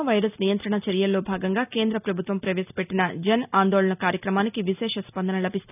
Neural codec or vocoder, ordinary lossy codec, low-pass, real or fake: none; none; 3.6 kHz; real